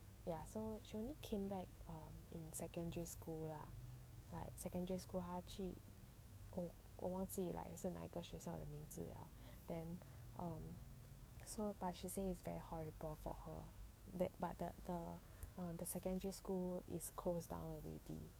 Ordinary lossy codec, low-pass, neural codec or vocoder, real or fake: none; none; codec, 44.1 kHz, 7.8 kbps, DAC; fake